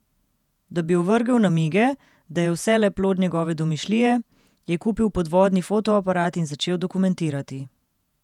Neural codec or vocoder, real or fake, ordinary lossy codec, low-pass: vocoder, 48 kHz, 128 mel bands, Vocos; fake; none; 19.8 kHz